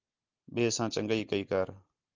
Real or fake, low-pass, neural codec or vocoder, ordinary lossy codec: real; 7.2 kHz; none; Opus, 32 kbps